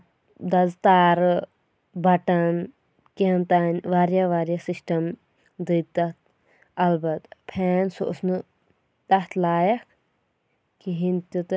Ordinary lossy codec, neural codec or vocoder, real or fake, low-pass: none; none; real; none